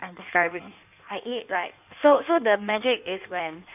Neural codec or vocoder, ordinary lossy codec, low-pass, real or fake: codec, 16 kHz in and 24 kHz out, 1.1 kbps, FireRedTTS-2 codec; none; 3.6 kHz; fake